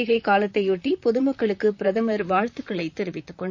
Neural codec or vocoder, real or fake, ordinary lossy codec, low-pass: vocoder, 44.1 kHz, 128 mel bands, Pupu-Vocoder; fake; none; 7.2 kHz